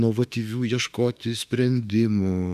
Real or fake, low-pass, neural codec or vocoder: fake; 14.4 kHz; autoencoder, 48 kHz, 32 numbers a frame, DAC-VAE, trained on Japanese speech